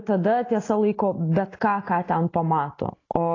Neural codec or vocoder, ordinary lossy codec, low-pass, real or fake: none; AAC, 32 kbps; 7.2 kHz; real